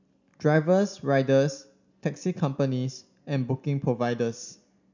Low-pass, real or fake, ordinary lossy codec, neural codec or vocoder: 7.2 kHz; real; none; none